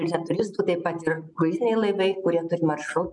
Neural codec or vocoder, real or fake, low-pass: none; real; 10.8 kHz